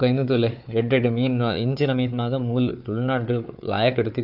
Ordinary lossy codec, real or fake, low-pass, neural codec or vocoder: AAC, 48 kbps; fake; 5.4 kHz; codec, 16 kHz, 4 kbps, FunCodec, trained on Chinese and English, 50 frames a second